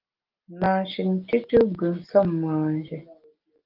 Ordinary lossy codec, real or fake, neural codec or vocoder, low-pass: Opus, 24 kbps; real; none; 5.4 kHz